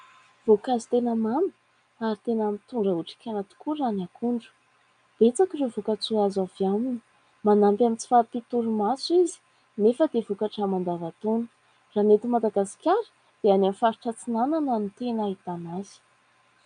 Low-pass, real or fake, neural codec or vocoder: 9.9 kHz; real; none